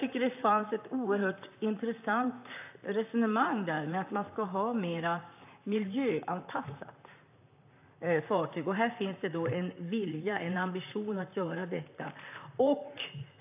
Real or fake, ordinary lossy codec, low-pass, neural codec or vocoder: fake; AAC, 32 kbps; 3.6 kHz; vocoder, 44.1 kHz, 128 mel bands, Pupu-Vocoder